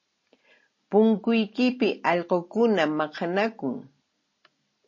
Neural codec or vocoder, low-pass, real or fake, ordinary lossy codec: none; 7.2 kHz; real; MP3, 32 kbps